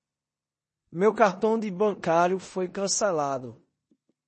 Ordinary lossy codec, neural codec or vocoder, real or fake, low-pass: MP3, 32 kbps; codec, 16 kHz in and 24 kHz out, 0.9 kbps, LongCat-Audio-Codec, four codebook decoder; fake; 10.8 kHz